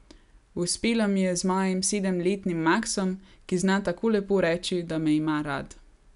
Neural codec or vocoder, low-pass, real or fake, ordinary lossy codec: none; 10.8 kHz; real; none